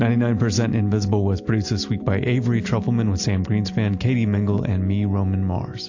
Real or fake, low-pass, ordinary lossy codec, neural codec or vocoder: real; 7.2 kHz; AAC, 48 kbps; none